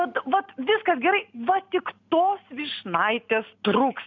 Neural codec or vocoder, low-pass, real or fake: none; 7.2 kHz; real